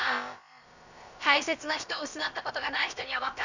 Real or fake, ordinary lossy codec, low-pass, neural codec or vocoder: fake; none; 7.2 kHz; codec, 16 kHz, about 1 kbps, DyCAST, with the encoder's durations